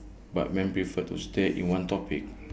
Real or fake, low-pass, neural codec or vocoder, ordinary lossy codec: real; none; none; none